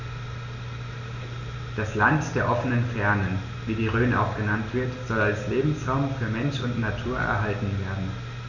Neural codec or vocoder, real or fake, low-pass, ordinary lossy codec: none; real; 7.2 kHz; none